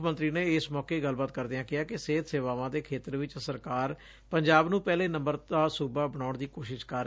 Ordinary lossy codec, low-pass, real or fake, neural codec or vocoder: none; none; real; none